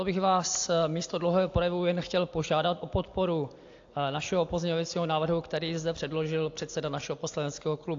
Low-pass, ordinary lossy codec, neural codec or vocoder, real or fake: 7.2 kHz; AAC, 48 kbps; none; real